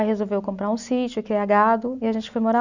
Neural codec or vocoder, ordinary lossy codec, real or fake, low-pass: none; none; real; 7.2 kHz